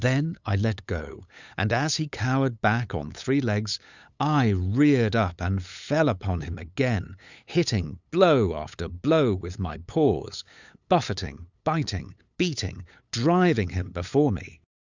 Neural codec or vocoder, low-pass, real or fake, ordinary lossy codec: codec, 16 kHz, 8 kbps, FunCodec, trained on LibriTTS, 25 frames a second; 7.2 kHz; fake; Opus, 64 kbps